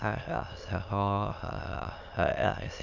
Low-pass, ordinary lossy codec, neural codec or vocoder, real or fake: 7.2 kHz; none; autoencoder, 22.05 kHz, a latent of 192 numbers a frame, VITS, trained on many speakers; fake